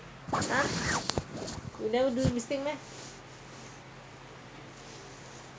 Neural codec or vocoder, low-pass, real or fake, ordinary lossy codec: none; none; real; none